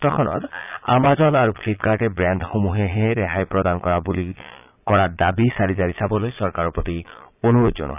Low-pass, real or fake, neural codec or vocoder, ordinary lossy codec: 3.6 kHz; fake; vocoder, 22.05 kHz, 80 mel bands, Vocos; none